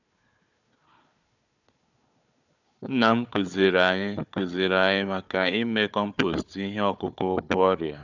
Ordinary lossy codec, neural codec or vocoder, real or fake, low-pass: none; codec, 16 kHz, 4 kbps, FunCodec, trained on Chinese and English, 50 frames a second; fake; 7.2 kHz